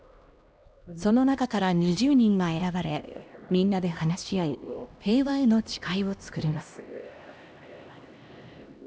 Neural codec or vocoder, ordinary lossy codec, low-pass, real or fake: codec, 16 kHz, 1 kbps, X-Codec, HuBERT features, trained on LibriSpeech; none; none; fake